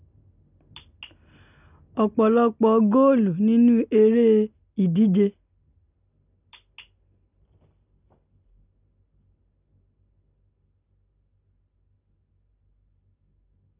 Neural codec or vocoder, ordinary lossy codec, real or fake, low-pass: none; none; real; 3.6 kHz